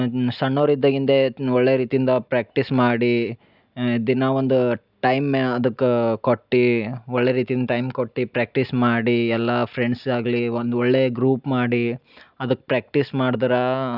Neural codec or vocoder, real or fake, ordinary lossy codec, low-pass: none; real; none; 5.4 kHz